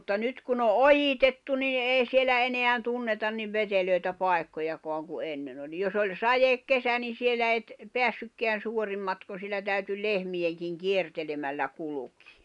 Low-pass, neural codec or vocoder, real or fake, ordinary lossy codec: 10.8 kHz; none; real; none